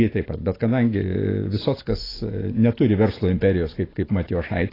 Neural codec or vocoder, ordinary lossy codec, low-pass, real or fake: none; AAC, 24 kbps; 5.4 kHz; real